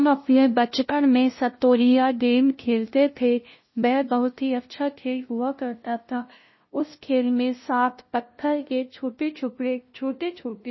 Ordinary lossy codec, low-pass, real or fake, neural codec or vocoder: MP3, 24 kbps; 7.2 kHz; fake; codec, 16 kHz, 0.5 kbps, FunCodec, trained on LibriTTS, 25 frames a second